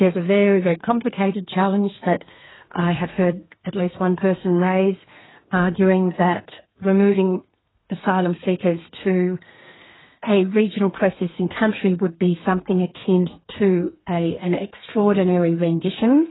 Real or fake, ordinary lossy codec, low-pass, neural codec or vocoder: fake; AAC, 16 kbps; 7.2 kHz; codec, 32 kHz, 1.9 kbps, SNAC